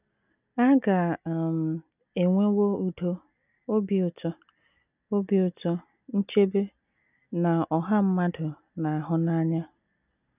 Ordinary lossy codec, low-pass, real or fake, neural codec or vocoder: none; 3.6 kHz; real; none